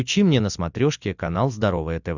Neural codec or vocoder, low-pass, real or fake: none; 7.2 kHz; real